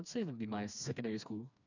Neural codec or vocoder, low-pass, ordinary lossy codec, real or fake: codec, 16 kHz, 2 kbps, FreqCodec, smaller model; 7.2 kHz; none; fake